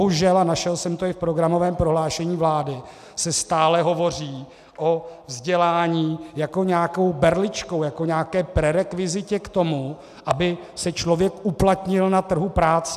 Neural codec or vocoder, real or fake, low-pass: none; real; 14.4 kHz